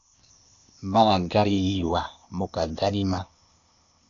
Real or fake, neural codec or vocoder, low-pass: fake; codec, 16 kHz, 0.8 kbps, ZipCodec; 7.2 kHz